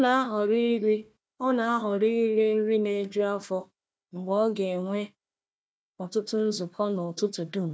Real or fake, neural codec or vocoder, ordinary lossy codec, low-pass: fake; codec, 16 kHz, 1 kbps, FunCodec, trained on Chinese and English, 50 frames a second; none; none